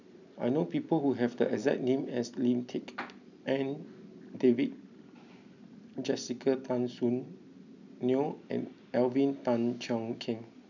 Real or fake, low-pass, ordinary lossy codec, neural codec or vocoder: real; 7.2 kHz; none; none